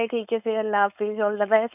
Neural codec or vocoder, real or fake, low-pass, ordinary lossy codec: codec, 16 kHz, 4.8 kbps, FACodec; fake; 3.6 kHz; none